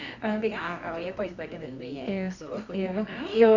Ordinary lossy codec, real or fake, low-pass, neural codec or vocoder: none; fake; 7.2 kHz; codec, 24 kHz, 0.9 kbps, WavTokenizer, medium music audio release